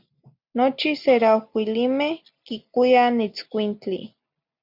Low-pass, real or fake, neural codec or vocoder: 5.4 kHz; real; none